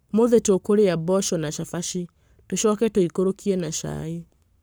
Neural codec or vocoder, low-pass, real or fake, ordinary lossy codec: codec, 44.1 kHz, 7.8 kbps, Pupu-Codec; none; fake; none